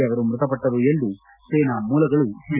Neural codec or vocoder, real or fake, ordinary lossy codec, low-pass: none; real; none; 3.6 kHz